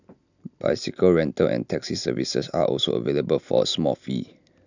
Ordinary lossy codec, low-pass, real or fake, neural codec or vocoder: none; 7.2 kHz; real; none